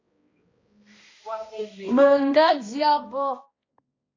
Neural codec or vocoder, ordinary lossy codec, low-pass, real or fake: codec, 16 kHz, 1 kbps, X-Codec, HuBERT features, trained on balanced general audio; AAC, 32 kbps; 7.2 kHz; fake